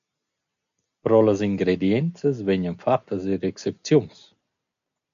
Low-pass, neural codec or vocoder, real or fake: 7.2 kHz; none; real